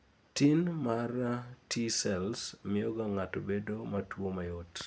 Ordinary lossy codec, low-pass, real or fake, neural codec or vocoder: none; none; real; none